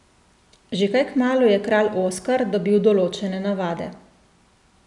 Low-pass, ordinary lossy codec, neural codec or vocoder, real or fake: 10.8 kHz; none; none; real